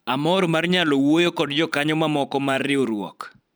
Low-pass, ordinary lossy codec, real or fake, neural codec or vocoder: none; none; fake; vocoder, 44.1 kHz, 128 mel bands every 512 samples, BigVGAN v2